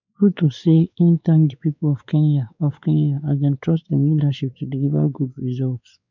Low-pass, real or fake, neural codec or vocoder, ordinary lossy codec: 7.2 kHz; fake; codec, 16 kHz, 4 kbps, X-Codec, WavLM features, trained on Multilingual LibriSpeech; none